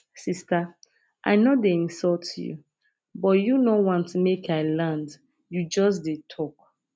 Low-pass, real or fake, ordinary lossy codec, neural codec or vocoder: none; real; none; none